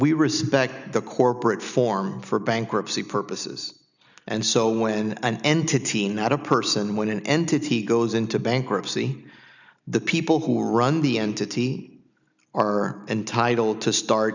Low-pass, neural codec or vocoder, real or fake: 7.2 kHz; none; real